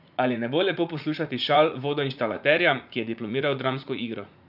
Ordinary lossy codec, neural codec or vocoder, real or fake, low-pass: none; vocoder, 44.1 kHz, 80 mel bands, Vocos; fake; 5.4 kHz